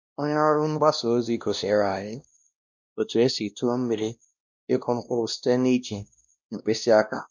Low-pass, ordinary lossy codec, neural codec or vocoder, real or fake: 7.2 kHz; none; codec, 16 kHz, 1 kbps, X-Codec, WavLM features, trained on Multilingual LibriSpeech; fake